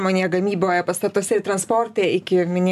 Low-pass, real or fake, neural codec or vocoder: 14.4 kHz; real; none